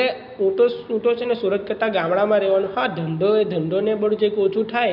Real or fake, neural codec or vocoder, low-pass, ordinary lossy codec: real; none; 5.4 kHz; none